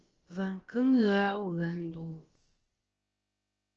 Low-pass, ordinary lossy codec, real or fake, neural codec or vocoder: 7.2 kHz; Opus, 16 kbps; fake; codec, 16 kHz, about 1 kbps, DyCAST, with the encoder's durations